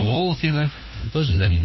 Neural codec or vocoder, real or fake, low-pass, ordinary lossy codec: codec, 16 kHz, 1 kbps, FunCodec, trained on LibriTTS, 50 frames a second; fake; 7.2 kHz; MP3, 24 kbps